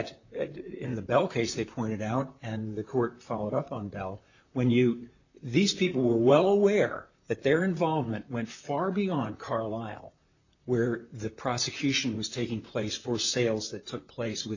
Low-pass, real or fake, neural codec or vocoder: 7.2 kHz; fake; vocoder, 44.1 kHz, 128 mel bands, Pupu-Vocoder